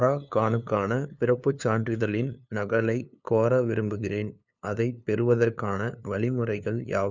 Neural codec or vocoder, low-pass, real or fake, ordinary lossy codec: codec, 16 kHz, 2 kbps, FunCodec, trained on LibriTTS, 25 frames a second; 7.2 kHz; fake; none